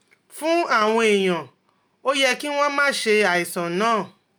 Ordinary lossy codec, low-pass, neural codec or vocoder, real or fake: none; none; none; real